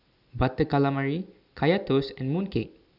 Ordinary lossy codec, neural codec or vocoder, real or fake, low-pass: none; none; real; 5.4 kHz